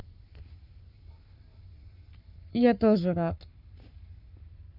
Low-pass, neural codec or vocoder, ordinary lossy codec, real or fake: 5.4 kHz; codec, 44.1 kHz, 7.8 kbps, Pupu-Codec; none; fake